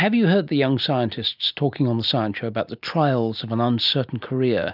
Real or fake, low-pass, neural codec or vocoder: real; 5.4 kHz; none